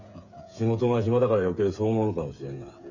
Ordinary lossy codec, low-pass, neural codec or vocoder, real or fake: none; 7.2 kHz; codec, 16 kHz, 8 kbps, FreqCodec, smaller model; fake